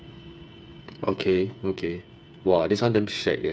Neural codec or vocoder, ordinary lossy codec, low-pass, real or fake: codec, 16 kHz, 8 kbps, FreqCodec, smaller model; none; none; fake